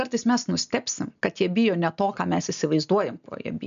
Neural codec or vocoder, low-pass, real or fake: none; 7.2 kHz; real